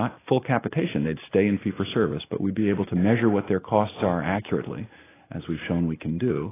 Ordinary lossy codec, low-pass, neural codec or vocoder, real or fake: AAC, 16 kbps; 3.6 kHz; none; real